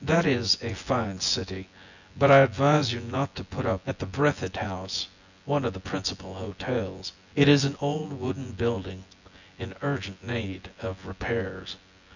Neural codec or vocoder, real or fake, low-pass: vocoder, 24 kHz, 100 mel bands, Vocos; fake; 7.2 kHz